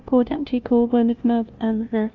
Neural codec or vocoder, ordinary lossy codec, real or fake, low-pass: codec, 16 kHz, 0.5 kbps, FunCodec, trained on LibriTTS, 25 frames a second; Opus, 24 kbps; fake; 7.2 kHz